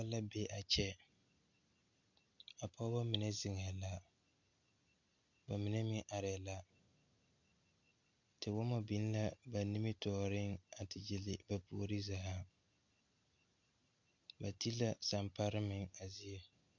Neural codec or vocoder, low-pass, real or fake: none; 7.2 kHz; real